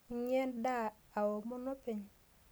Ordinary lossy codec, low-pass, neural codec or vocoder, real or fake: none; none; none; real